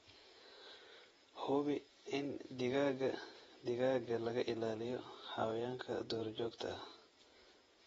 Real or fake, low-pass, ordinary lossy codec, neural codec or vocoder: fake; 19.8 kHz; AAC, 24 kbps; vocoder, 48 kHz, 128 mel bands, Vocos